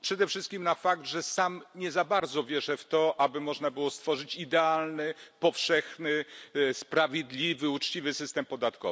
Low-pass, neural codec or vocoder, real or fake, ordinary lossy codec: none; none; real; none